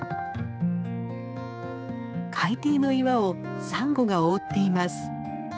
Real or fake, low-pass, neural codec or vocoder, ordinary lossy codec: fake; none; codec, 16 kHz, 4 kbps, X-Codec, HuBERT features, trained on general audio; none